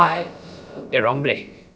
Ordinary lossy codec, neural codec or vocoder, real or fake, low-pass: none; codec, 16 kHz, about 1 kbps, DyCAST, with the encoder's durations; fake; none